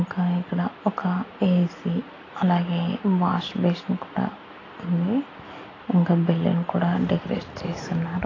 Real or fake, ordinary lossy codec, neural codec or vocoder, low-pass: real; AAC, 32 kbps; none; 7.2 kHz